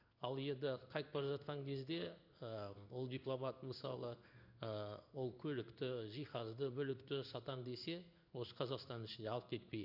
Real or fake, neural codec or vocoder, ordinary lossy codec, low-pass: fake; codec, 16 kHz in and 24 kHz out, 1 kbps, XY-Tokenizer; none; 5.4 kHz